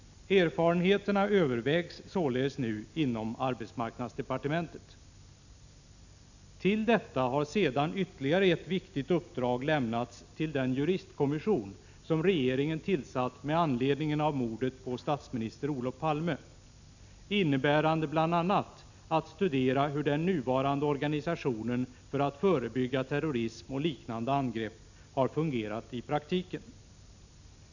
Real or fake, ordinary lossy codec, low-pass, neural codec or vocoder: real; none; 7.2 kHz; none